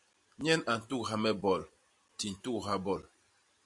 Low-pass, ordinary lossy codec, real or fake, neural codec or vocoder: 10.8 kHz; AAC, 64 kbps; real; none